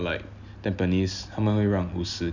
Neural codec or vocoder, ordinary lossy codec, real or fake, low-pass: none; none; real; 7.2 kHz